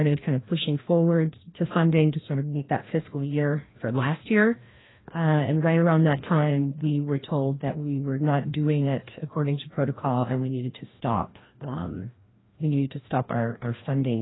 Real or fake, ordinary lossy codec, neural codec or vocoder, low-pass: fake; AAC, 16 kbps; codec, 16 kHz, 1 kbps, FreqCodec, larger model; 7.2 kHz